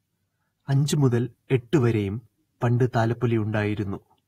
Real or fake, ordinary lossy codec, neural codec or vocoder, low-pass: fake; AAC, 48 kbps; vocoder, 48 kHz, 128 mel bands, Vocos; 19.8 kHz